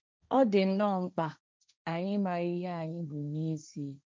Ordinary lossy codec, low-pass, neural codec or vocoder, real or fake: none; none; codec, 16 kHz, 1.1 kbps, Voila-Tokenizer; fake